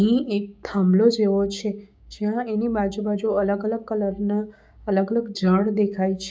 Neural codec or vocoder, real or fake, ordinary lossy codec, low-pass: codec, 16 kHz, 6 kbps, DAC; fake; none; none